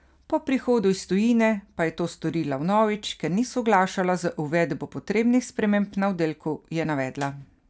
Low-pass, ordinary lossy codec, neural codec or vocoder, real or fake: none; none; none; real